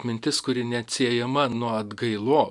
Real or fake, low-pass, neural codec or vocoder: real; 10.8 kHz; none